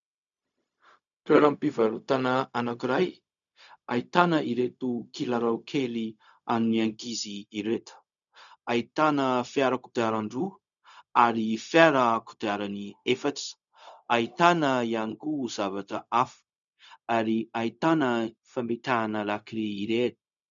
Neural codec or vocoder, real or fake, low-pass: codec, 16 kHz, 0.4 kbps, LongCat-Audio-Codec; fake; 7.2 kHz